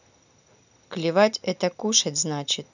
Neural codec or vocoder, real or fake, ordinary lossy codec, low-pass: none; real; none; 7.2 kHz